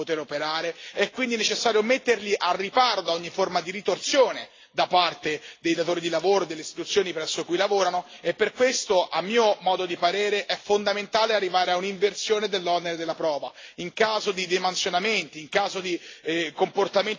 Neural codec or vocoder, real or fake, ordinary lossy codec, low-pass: none; real; AAC, 32 kbps; 7.2 kHz